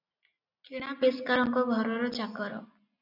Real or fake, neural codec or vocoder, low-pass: real; none; 5.4 kHz